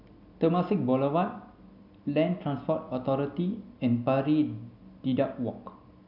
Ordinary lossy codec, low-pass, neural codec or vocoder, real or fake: none; 5.4 kHz; none; real